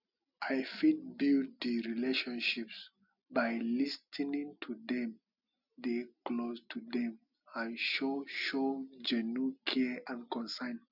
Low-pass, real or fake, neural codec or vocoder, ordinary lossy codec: 5.4 kHz; real; none; none